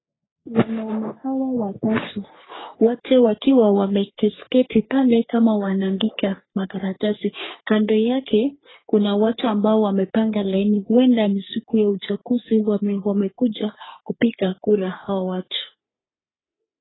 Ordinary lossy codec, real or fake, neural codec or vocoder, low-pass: AAC, 16 kbps; fake; codec, 44.1 kHz, 3.4 kbps, Pupu-Codec; 7.2 kHz